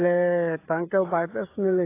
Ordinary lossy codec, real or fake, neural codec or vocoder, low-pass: AAC, 24 kbps; fake; codec, 16 kHz, 16 kbps, FreqCodec, smaller model; 3.6 kHz